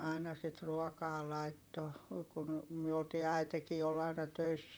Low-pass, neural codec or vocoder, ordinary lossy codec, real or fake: none; vocoder, 44.1 kHz, 128 mel bands, Pupu-Vocoder; none; fake